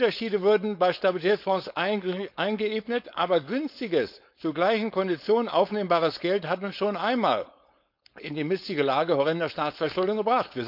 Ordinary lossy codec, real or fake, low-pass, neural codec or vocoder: none; fake; 5.4 kHz; codec, 16 kHz, 4.8 kbps, FACodec